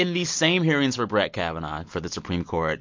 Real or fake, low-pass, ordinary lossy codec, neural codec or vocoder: real; 7.2 kHz; MP3, 48 kbps; none